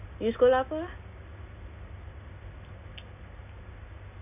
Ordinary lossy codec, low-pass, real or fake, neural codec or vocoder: none; 3.6 kHz; real; none